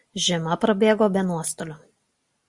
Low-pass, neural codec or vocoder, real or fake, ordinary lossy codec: 10.8 kHz; none; real; Opus, 64 kbps